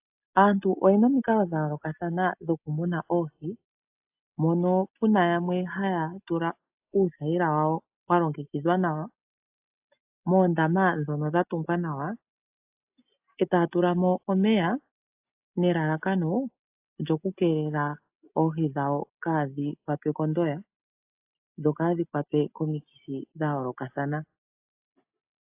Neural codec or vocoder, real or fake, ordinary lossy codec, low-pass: none; real; AAC, 32 kbps; 3.6 kHz